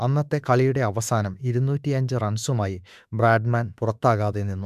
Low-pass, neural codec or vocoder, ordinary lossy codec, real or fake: 14.4 kHz; autoencoder, 48 kHz, 32 numbers a frame, DAC-VAE, trained on Japanese speech; none; fake